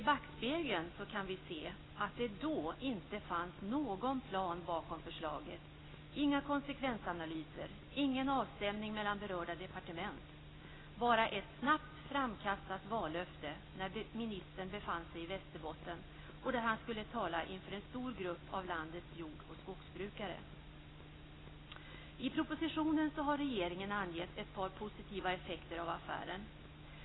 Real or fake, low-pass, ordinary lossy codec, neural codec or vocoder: real; 7.2 kHz; AAC, 16 kbps; none